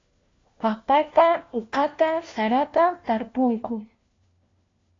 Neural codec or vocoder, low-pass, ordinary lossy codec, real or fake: codec, 16 kHz, 1 kbps, FunCodec, trained on LibriTTS, 50 frames a second; 7.2 kHz; AAC, 32 kbps; fake